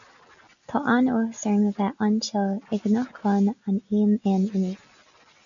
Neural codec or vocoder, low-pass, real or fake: none; 7.2 kHz; real